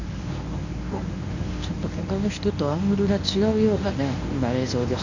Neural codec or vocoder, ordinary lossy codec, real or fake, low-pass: codec, 24 kHz, 0.9 kbps, WavTokenizer, medium speech release version 1; none; fake; 7.2 kHz